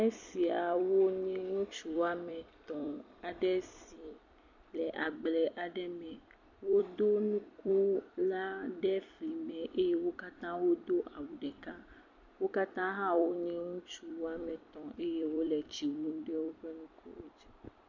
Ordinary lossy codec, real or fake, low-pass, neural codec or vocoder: MP3, 48 kbps; fake; 7.2 kHz; vocoder, 44.1 kHz, 128 mel bands every 256 samples, BigVGAN v2